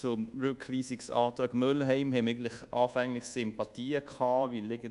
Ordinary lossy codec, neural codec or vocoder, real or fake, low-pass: none; codec, 24 kHz, 1.2 kbps, DualCodec; fake; 10.8 kHz